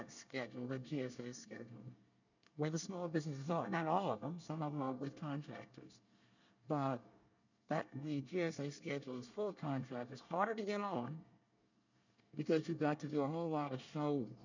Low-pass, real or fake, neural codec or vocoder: 7.2 kHz; fake; codec, 24 kHz, 1 kbps, SNAC